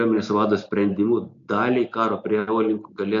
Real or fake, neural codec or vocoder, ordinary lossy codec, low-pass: real; none; MP3, 64 kbps; 7.2 kHz